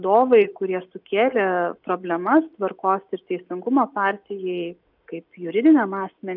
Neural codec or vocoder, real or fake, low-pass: none; real; 5.4 kHz